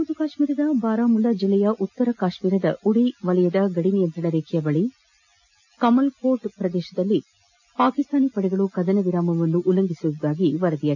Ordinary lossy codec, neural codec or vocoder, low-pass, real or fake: none; none; 7.2 kHz; real